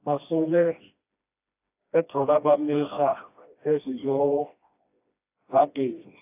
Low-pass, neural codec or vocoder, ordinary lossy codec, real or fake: 3.6 kHz; codec, 16 kHz, 1 kbps, FreqCodec, smaller model; AAC, 24 kbps; fake